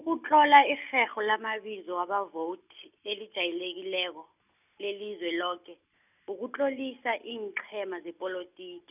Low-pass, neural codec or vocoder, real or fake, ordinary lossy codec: 3.6 kHz; none; real; none